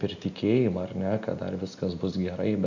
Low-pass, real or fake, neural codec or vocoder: 7.2 kHz; real; none